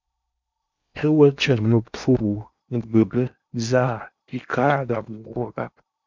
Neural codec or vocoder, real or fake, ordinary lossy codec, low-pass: codec, 16 kHz in and 24 kHz out, 0.8 kbps, FocalCodec, streaming, 65536 codes; fake; MP3, 64 kbps; 7.2 kHz